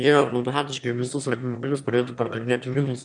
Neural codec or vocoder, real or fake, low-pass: autoencoder, 22.05 kHz, a latent of 192 numbers a frame, VITS, trained on one speaker; fake; 9.9 kHz